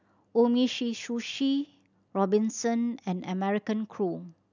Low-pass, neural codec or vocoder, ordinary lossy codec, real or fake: 7.2 kHz; none; none; real